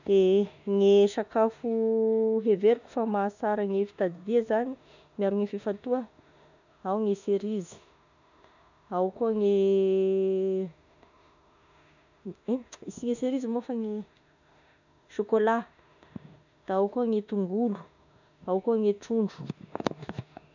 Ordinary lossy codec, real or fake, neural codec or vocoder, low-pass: none; fake; autoencoder, 48 kHz, 32 numbers a frame, DAC-VAE, trained on Japanese speech; 7.2 kHz